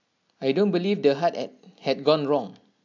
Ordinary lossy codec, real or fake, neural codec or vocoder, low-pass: MP3, 64 kbps; real; none; 7.2 kHz